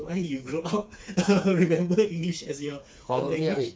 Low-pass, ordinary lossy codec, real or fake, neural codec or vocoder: none; none; fake; codec, 16 kHz, 4 kbps, FreqCodec, smaller model